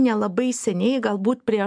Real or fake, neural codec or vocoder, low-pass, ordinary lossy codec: real; none; 9.9 kHz; MP3, 96 kbps